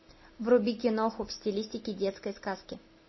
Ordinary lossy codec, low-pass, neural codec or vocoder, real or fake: MP3, 24 kbps; 7.2 kHz; none; real